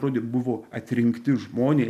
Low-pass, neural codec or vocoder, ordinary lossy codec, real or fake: 14.4 kHz; none; AAC, 96 kbps; real